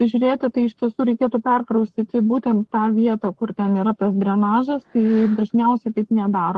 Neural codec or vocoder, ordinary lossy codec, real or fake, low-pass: codec, 44.1 kHz, 7.8 kbps, Pupu-Codec; Opus, 16 kbps; fake; 10.8 kHz